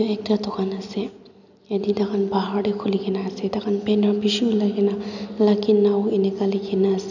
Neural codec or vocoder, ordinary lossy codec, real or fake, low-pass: none; none; real; 7.2 kHz